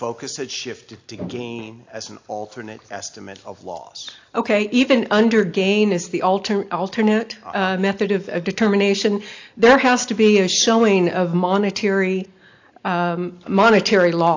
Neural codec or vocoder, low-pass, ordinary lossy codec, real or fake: none; 7.2 kHz; AAC, 48 kbps; real